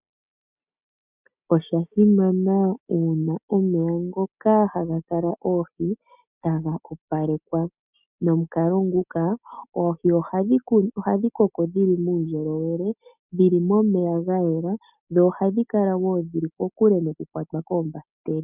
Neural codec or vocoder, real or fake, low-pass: none; real; 3.6 kHz